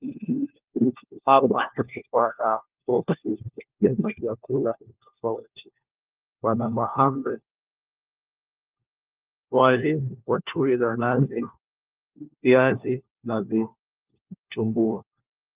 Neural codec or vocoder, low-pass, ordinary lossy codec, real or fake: codec, 16 kHz, 1 kbps, FunCodec, trained on LibriTTS, 50 frames a second; 3.6 kHz; Opus, 32 kbps; fake